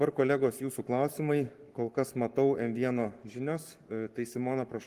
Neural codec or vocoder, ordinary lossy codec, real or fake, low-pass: codec, 44.1 kHz, 7.8 kbps, Pupu-Codec; Opus, 24 kbps; fake; 14.4 kHz